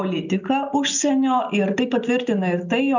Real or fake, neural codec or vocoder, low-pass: real; none; 7.2 kHz